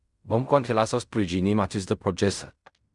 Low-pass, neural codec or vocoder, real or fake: 10.8 kHz; codec, 16 kHz in and 24 kHz out, 0.4 kbps, LongCat-Audio-Codec, fine tuned four codebook decoder; fake